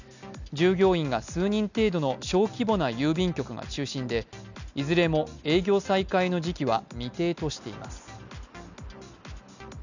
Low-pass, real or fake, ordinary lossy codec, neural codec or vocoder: 7.2 kHz; real; none; none